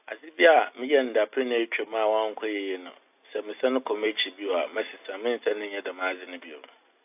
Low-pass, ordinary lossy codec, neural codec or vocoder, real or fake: 3.6 kHz; AAC, 32 kbps; none; real